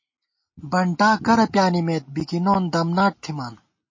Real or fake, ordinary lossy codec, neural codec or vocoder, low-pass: real; MP3, 32 kbps; none; 7.2 kHz